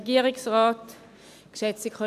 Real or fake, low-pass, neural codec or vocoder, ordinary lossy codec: real; 14.4 kHz; none; none